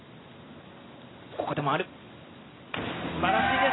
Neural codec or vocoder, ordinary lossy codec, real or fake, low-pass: none; AAC, 16 kbps; real; 7.2 kHz